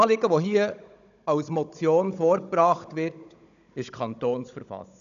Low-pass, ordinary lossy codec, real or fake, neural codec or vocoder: 7.2 kHz; none; fake; codec, 16 kHz, 16 kbps, FunCodec, trained on Chinese and English, 50 frames a second